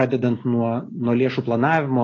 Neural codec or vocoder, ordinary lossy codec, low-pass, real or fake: none; AAC, 32 kbps; 7.2 kHz; real